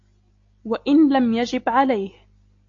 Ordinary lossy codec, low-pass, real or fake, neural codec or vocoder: AAC, 48 kbps; 7.2 kHz; real; none